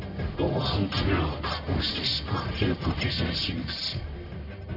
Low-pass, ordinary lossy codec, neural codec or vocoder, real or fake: 5.4 kHz; none; codec, 44.1 kHz, 1.7 kbps, Pupu-Codec; fake